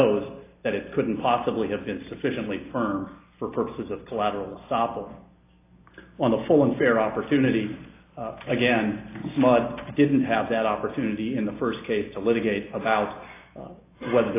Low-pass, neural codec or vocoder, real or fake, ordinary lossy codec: 3.6 kHz; none; real; AAC, 24 kbps